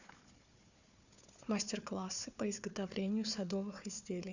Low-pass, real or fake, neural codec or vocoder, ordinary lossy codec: 7.2 kHz; fake; codec, 16 kHz, 4 kbps, FunCodec, trained on Chinese and English, 50 frames a second; none